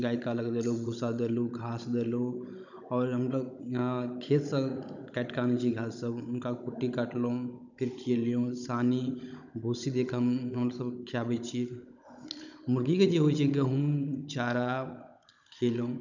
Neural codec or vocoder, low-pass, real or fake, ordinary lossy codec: codec, 16 kHz, 16 kbps, FunCodec, trained on Chinese and English, 50 frames a second; 7.2 kHz; fake; none